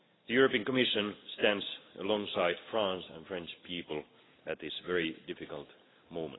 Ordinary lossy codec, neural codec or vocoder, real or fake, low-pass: AAC, 16 kbps; none; real; 7.2 kHz